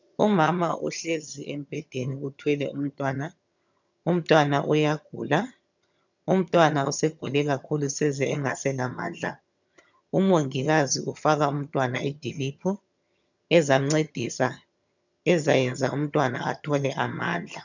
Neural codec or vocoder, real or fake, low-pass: vocoder, 22.05 kHz, 80 mel bands, HiFi-GAN; fake; 7.2 kHz